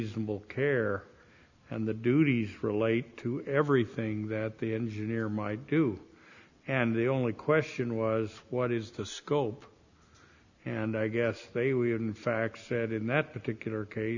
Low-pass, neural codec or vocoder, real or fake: 7.2 kHz; none; real